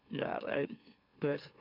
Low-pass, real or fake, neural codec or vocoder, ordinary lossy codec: 5.4 kHz; fake; autoencoder, 44.1 kHz, a latent of 192 numbers a frame, MeloTTS; none